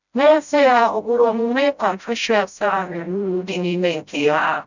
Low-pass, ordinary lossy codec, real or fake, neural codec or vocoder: 7.2 kHz; none; fake; codec, 16 kHz, 0.5 kbps, FreqCodec, smaller model